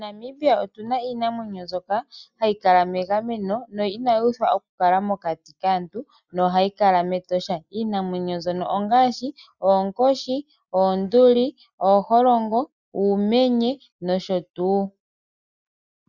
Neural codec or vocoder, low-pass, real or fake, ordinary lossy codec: none; 7.2 kHz; real; Opus, 64 kbps